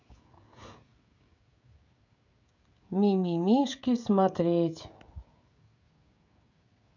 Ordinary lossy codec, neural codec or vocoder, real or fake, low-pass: none; codec, 16 kHz, 16 kbps, FreqCodec, smaller model; fake; 7.2 kHz